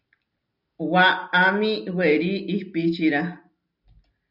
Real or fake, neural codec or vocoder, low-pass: real; none; 5.4 kHz